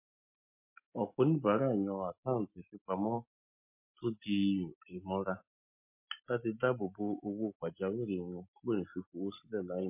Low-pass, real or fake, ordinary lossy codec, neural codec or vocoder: 3.6 kHz; real; MP3, 24 kbps; none